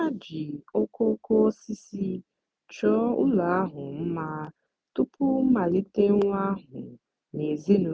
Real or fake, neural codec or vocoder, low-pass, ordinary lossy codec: real; none; none; none